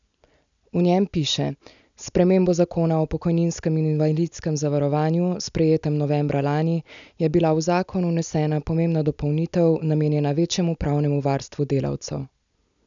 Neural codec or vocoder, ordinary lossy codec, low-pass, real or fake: none; none; 7.2 kHz; real